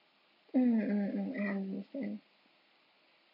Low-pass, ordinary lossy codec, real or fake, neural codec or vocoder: 5.4 kHz; none; real; none